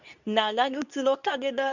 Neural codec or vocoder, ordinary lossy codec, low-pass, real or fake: codec, 24 kHz, 0.9 kbps, WavTokenizer, medium speech release version 2; none; 7.2 kHz; fake